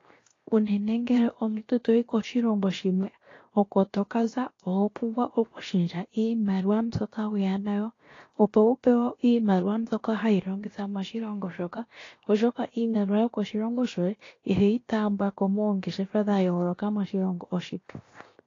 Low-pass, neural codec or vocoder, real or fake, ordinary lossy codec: 7.2 kHz; codec, 16 kHz, 0.7 kbps, FocalCodec; fake; AAC, 32 kbps